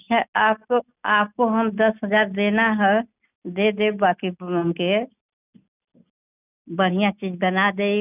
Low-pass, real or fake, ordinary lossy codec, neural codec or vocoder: 3.6 kHz; real; none; none